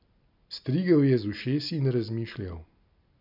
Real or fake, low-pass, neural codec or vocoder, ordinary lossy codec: real; 5.4 kHz; none; none